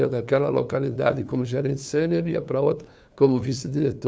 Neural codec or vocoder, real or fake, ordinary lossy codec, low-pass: codec, 16 kHz, 2 kbps, FunCodec, trained on LibriTTS, 25 frames a second; fake; none; none